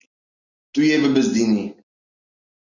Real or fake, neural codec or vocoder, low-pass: real; none; 7.2 kHz